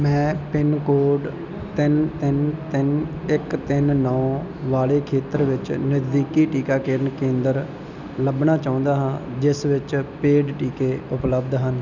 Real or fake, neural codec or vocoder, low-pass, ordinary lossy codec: real; none; 7.2 kHz; none